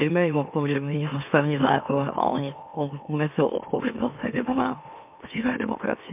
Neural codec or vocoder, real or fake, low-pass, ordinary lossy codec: autoencoder, 44.1 kHz, a latent of 192 numbers a frame, MeloTTS; fake; 3.6 kHz; MP3, 32 kbps